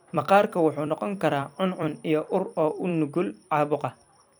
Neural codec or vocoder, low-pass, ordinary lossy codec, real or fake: vocoder, 44.1 kHz, 128 mel bands every 256 samples, BigVGAN v2; none; none; fake